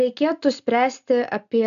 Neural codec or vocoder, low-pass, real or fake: none; 7.2 kHz; real